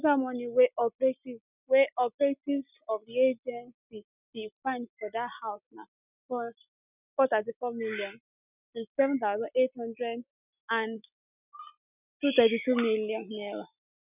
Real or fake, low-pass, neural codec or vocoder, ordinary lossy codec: real; 3.6 kHz; none; none